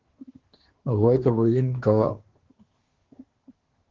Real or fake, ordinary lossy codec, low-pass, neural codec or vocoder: fake; Opus, 16 kbps; 7.2 kHz; codec, 24 kHz, 1 kbps, SNAC